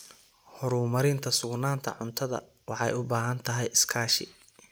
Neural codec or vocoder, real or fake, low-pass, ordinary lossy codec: none; real; none; none